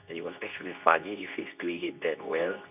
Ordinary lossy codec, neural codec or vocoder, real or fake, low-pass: none; codec, 24 kHz, 0.9 kbps, WavTokenizer, medium speech release version 2; fake; 3.6 kHz